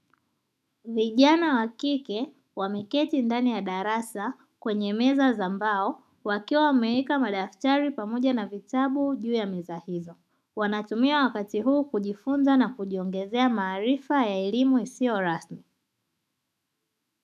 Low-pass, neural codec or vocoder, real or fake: 14.4 kHz; autoencoder, 48 kHz, 128 numbers a frame, DAC-VAE, trained on Japanese speech; fake